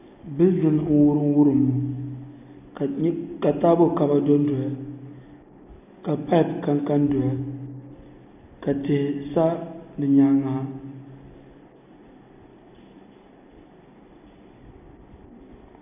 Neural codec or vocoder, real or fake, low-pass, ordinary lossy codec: none; real; 3.6 kHz; AAC, 32 kbps